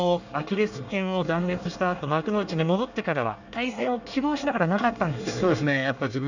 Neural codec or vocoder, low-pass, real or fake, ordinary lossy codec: codec, 24 kHz, 1 kbps, SNAC; 7.2 kHz; fake; none